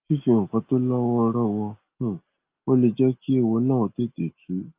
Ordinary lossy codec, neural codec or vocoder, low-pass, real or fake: Opus, 24 kbps; none; 3.6 kHz; real